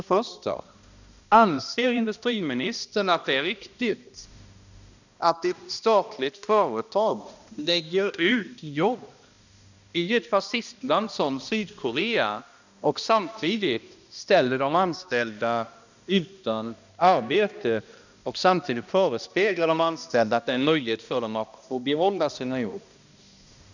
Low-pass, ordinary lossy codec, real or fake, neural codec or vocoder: 7.2 kHz; none; fake; codec, 16 kHz, 1 kbps, X-Codec, HuBERT features, trained on balanced general audio